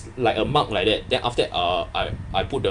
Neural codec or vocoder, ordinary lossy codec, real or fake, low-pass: vocoder, 44.1 kHz, 128 mel bands every 512 samples, BigVGAN v2; none; fake; 10.8 kHz